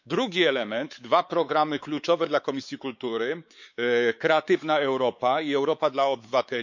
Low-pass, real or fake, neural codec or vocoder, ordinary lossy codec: 7.2 kHz; fake; codec, 16 kHz, 4 kbps, X-Codec, WavLM features, trained on Multilingual LibriSpeech; none